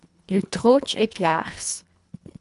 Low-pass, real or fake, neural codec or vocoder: 10.8 kHz; fake; codec, 24 kHz, 1.5 kbps, HILCodec